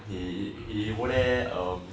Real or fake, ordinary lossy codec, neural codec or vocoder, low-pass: real; none; none; none